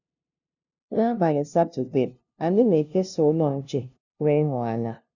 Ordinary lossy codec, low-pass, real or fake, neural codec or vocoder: none; 7.2 kHz; fake; codec, 16 kHz, 0.5 kbps, FunCodec, trained on LibriTTS, 25 frames a second